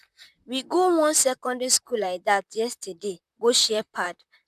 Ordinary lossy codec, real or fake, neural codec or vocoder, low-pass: none; fake; vocoder, 44.1 kHz, 128 mel bands, Pupu-Vocoder; 14.4 kHz